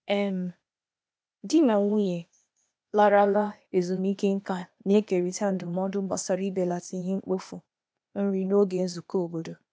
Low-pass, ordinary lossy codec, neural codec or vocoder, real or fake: none; none; codec, 16 kHz, 0.8 kbps, ZipCodec; fake